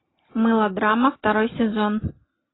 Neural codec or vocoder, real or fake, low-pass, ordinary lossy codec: none; real; 7.2 kHz; AAC, 16 kbps